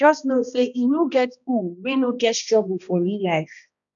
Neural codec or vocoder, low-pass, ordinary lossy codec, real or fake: codec, 16 kHz, 1 kbps, X-Codec, HuBERT features, trained on balanced general audio; 7.2 kHz; none; fake